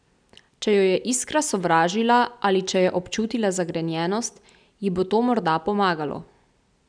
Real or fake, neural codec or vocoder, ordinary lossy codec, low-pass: real; none; none; 9.9 kHz